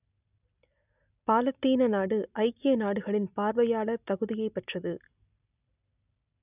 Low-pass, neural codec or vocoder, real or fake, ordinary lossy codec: 3.6 kHz; none; real; none